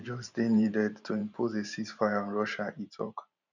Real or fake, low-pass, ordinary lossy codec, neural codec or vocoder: fake; 7.2 kHz; none; vocoder, 44.1 kHz, 128 mel bands every 512 samples, BigVGAN v2